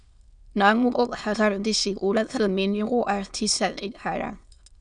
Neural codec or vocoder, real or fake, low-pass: autoencoder, 22.05 kHz, a latent of 192 numbers a frame, VITS, trained on many speakers; fake; 9.9 kHz